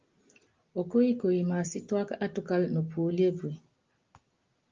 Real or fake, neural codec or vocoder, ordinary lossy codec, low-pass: real; none; Opus, 32 kbps; 7.2 kHz